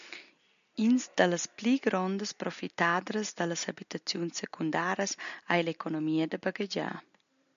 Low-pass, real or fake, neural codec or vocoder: 7.2 kHz; real; none